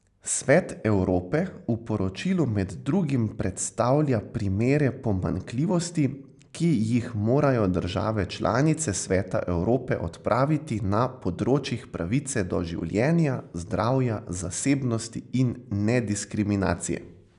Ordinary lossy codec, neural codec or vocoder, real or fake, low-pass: none; none; real; 10.8 kHz